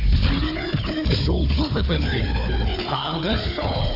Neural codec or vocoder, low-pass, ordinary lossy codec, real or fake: codec, 16 kHz, 4 kbps, FunCodec, trained on Chinese and English, 50 frames a second; 5.4 kHz; AAC, 32 kbps; fake